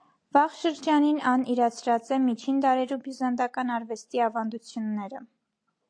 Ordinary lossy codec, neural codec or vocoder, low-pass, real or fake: AAC, 48 kbps; none; 9.9 kHz; real